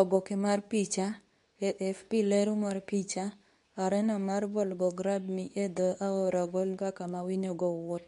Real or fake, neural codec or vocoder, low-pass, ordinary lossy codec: fake; codec, 24 kHz, 0.9 kbps, WavTokenizer, medium speech release version 2; 10.8 kHz; none